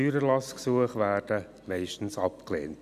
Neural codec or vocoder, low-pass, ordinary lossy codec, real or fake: none; 14.4 kHz; none; real